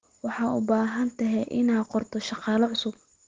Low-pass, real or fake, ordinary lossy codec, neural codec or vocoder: 7.2 kHz; real; Opus, 16 kbps; none